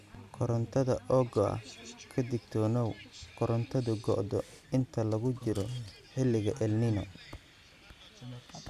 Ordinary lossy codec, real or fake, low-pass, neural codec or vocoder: none; real; 14.4 kHz; none